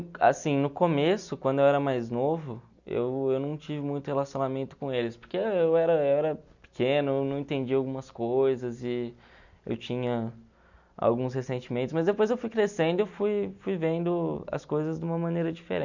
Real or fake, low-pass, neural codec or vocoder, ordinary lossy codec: real; 7.2 kHz; none; none